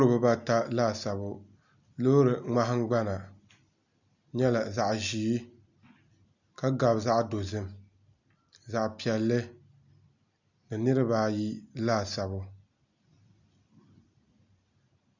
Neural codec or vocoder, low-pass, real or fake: none; 7.2 kHz; real